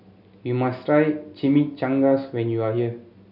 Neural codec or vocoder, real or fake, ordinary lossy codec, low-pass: none; real; none; 5.4 kHz